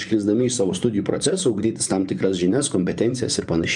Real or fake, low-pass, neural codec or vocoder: fake; 10.8 kHz; vocoder, 24 kHz, 100 mel bands, Vocos